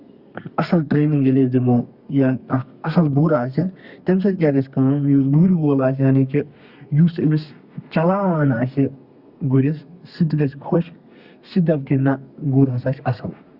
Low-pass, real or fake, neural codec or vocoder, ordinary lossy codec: 5.4 kHz; fake; codec, 32 kHz, 1.9 kbps, SNAC; Opus, 64 kbps